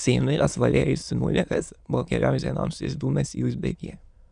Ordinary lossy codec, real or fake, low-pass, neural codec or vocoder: Opus, 64 kbps; fake; 9.9 kHz; autoencoder, 22.05 kHz, a latent of 192 numbers a frame, VITS, trained on many speakers